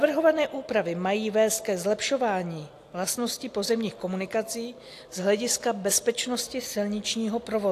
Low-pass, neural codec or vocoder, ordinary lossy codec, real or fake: 14.4 kHz; none; AAC, 64 kbps; real